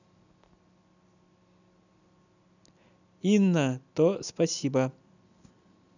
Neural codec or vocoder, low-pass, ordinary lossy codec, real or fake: none; 7.2 kHz; none; real